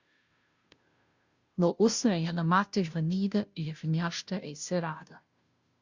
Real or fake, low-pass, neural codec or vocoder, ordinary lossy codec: fake; 7.2 kHz; codec, 16 kHz, 0.5 kbps, FunCodec, trained on Chinese and English, 25 frames a second; Opus, 64 kbps